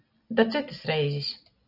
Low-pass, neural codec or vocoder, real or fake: 5.4 kHz; none; real